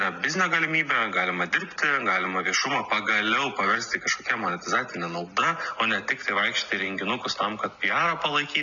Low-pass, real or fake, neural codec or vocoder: 7.2 kHz; real; none